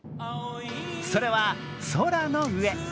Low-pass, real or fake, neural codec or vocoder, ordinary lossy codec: none; real; none; none